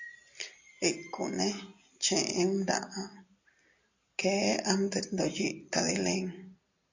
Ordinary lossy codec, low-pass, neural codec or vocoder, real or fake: AAC, 48 kbps; 7.2 kHz; none; real